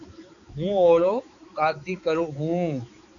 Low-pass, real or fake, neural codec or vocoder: 7.2 kHz; fake; codec, 16 kHz, 4 kbps, X-Codec, HuBERT features, trained on balanced general audio